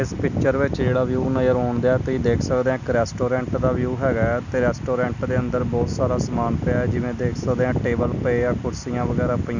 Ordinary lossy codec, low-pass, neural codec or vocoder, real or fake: none; 7.2 kHz; none; real